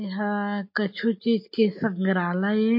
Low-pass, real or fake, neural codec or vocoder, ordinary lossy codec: 5.4 kHz; real; none; MP3, 32 kbps